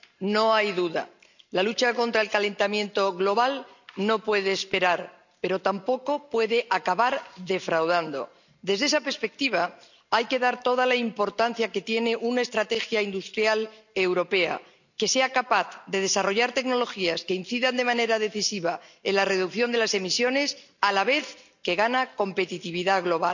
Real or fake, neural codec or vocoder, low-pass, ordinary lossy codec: real; none; 7.2 kHz; none